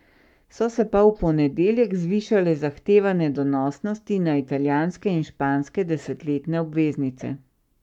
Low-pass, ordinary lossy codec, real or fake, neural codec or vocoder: 19.8 kHz; none; fake; codec, 44.1 kHz, 7.8 kbps, Pupu-Codec